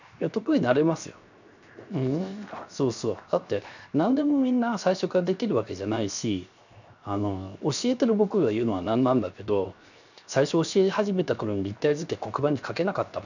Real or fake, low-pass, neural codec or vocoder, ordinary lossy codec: fake; 7.2 kHz; codec, 16 kHz, 0.7 kbps, FocalCodec; none